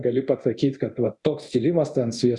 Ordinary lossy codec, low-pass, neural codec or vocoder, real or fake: Opus, 64 kbps; 10.8 kHz; codec, 24 kHz, 0.9 kbps, DualCodec; fake